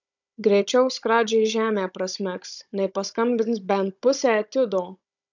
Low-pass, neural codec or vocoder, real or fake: 7.2 kHz; codec, 16 kHz, 16 kbps, FunCodec, trained on Chinese and English, 50 frames a second; fake